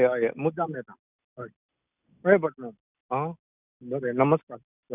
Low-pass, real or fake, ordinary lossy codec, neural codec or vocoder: 3.6 kHz; real; none; none